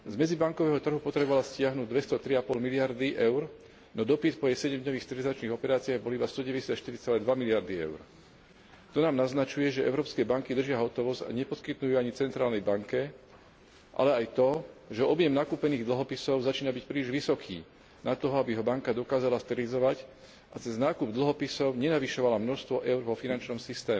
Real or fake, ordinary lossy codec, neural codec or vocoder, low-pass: real; none; none; none